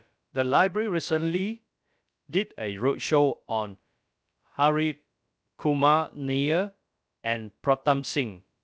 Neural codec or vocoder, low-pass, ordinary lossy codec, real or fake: codec, 16 kHz, about 1 kbps, DyCAST, with the encoder's durations; none; none; fake